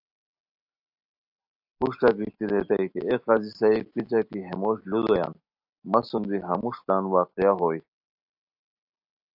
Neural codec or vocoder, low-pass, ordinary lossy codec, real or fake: none; 5.4 kHz; AAC, 48 kbps; real